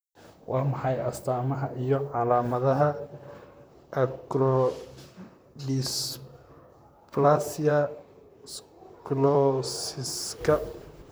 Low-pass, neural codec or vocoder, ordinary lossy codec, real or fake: none; vocoder, 44.1 kHz, 128 mel bands, Pupu-Vocoder; none; fake